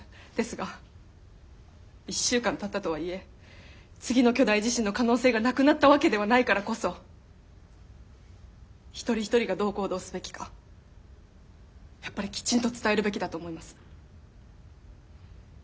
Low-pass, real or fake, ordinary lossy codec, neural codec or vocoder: none; real; none; none